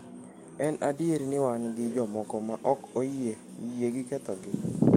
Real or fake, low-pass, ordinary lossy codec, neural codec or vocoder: fake; 19.8 kHz; MP3, 64 kbps; codec, 44.1 kHz, 7.8 kbps, DAC